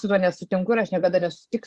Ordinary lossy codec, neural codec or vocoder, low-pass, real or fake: Opus, 64 kbps; vocoder, 24 kHz, 100 mel bands, Vocos; 10.8 kHz; fake